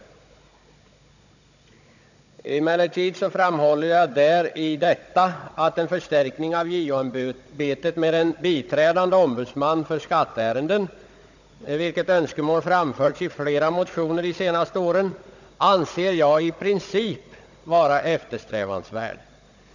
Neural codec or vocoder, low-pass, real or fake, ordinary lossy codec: codec, 16 kHz, 16 kbps, FunCodec, trained on Chinese and English, 50 frames a second; 7.2 kHz; fake; none